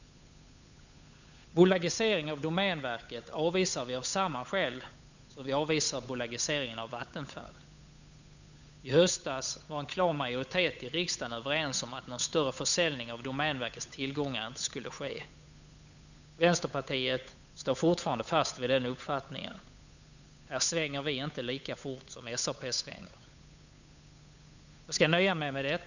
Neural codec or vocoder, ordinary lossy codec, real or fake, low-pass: codec, 16 kHz, 8 kbps, FunCodec, trained on Chinese and English, 25 frames a second; none; fake; 7.2 kHz